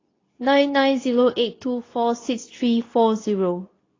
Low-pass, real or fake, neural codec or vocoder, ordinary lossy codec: 7.2 kHz; fake; codec, 24 kHz, 0.9 kbps, WavTokenizer, medium speech release version 2; AAC, 32 kbps